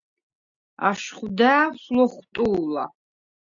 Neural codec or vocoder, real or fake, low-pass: none; real; 7.2 kHz